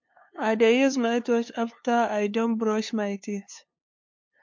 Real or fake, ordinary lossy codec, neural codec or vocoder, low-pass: fake; MP3, 48 kbps; codec, 16 kHz, 2 kbps, FunCodec, trained on LibriTTS, 25 frames a second; 7.2 kHz